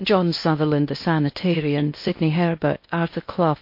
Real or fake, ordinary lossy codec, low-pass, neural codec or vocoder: fake; MP3, 32 kbps; 5.4 kHz; codec, 16 kHz in and 24 kHz out, 0.6 kbps, FocalCodec, streaming, 2048 codes